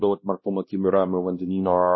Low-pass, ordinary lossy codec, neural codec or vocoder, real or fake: 7.2 kHz; MP3, 24 kbps; codec, 16 kHz, 1 kbps, X-Codec, WavLM features, trained on Multilingual LibriSpeech; fake